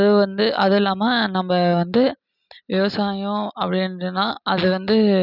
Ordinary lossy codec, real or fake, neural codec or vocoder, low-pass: none; real; none; 5.4 kHz